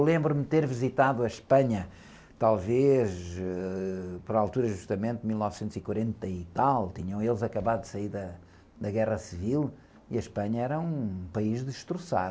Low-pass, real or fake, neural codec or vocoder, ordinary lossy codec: none; real; none; none